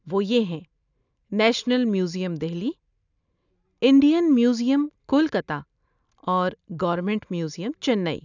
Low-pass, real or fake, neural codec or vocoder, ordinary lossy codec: 7.2 kHz; real; none; none